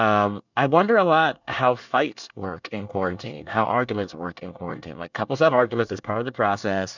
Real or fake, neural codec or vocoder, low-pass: fake; codec, 24 kHz, 1 kbps, SNAC; 7.2 kHz